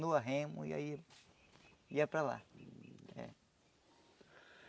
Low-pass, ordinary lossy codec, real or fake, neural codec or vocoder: none; none; real; none